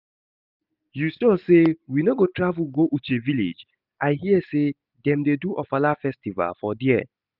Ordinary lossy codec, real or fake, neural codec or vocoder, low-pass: none; real; none; 5.4 kHz